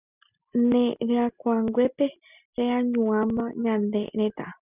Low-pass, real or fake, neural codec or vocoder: 3.6 kHz; real; none